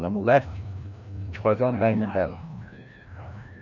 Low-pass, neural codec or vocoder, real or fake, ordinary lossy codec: 7.2 kHz; codec, 16 kHz, 1 kbps, FreqCodec, larger model; fake; none